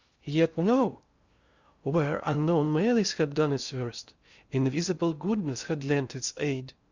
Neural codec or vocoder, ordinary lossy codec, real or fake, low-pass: codec, 16 kHz in and 24 kHz out, 0.6 kbps, FocalCodec, streaming, 2048 codes; Opus, 64 kbps; fake; 7.2 kHz